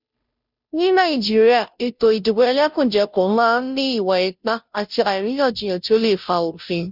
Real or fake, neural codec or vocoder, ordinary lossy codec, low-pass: fake; codec, 16 kHz, 0.5 kbps, FunCodec, trained on Chinese and English, 25 frames a second; none; 5.4 kHz